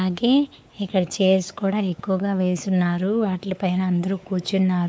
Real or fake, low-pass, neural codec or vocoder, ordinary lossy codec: fake; none; codec, 16 kHz, 4 kbps, X-Codec, WavLM features, trained on Multilingual LibriSpeech; none